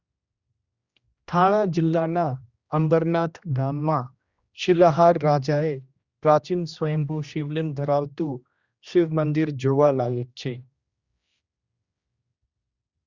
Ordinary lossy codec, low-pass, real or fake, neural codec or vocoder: Opus, 64 kbps; 7.2 kHz; fake; codec, 16 kHz, 1 kbps, X-Codec, HuBERT features, trained on general audio